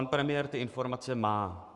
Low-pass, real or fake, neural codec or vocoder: 10.8 kHz; fake; codec, 44.1 kHz, 7.8 kbps, Pupu-Codec